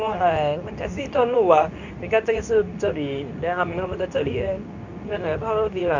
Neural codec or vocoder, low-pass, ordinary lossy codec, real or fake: codec, 24 kHz, 0.9 kbps, WavTokenizer, medium speech release version 2; 7.2 kHz; none; fake